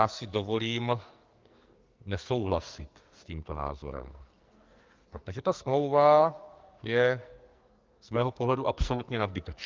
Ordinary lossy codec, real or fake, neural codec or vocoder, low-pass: Opus, 24 kbps; fake; codec, 44.1 kHz, 2.6 kbps, SNAC; 7.2 kHz